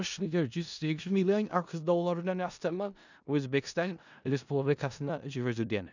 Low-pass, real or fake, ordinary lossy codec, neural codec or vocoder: 7.2 kHz; fake; none; codec, 16 kHz in and 24 kHz out, 0.4 kbps, LongCat-Audio-Codec, four codebook decoder